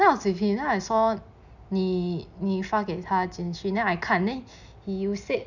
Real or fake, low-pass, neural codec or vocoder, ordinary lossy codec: real; 7.2 kHz; none; none